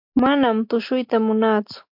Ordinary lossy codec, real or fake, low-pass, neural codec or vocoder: MP3, 48 kbps; real; 5.4 kHz; none